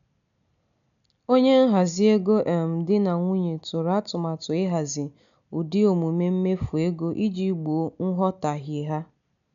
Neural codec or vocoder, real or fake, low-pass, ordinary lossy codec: none; real; 7.2 kHz; none